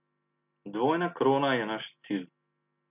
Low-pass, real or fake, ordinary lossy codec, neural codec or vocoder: 3.6 kHz; real; none; none